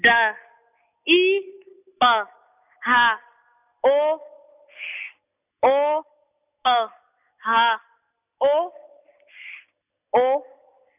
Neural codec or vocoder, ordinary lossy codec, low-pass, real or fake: none; none; 3.6 kHz; real